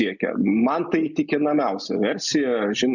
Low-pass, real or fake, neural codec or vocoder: 7.2 kHz; real; none